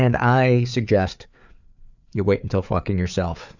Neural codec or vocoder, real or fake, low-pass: codec, 16 kHz, 4 kbps, FreqCodec, larger model; fake; 7.2 kHz